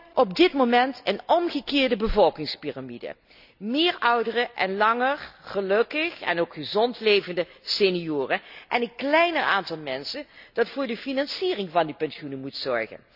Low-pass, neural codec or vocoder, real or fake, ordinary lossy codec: 5.4 kHz; none; real; none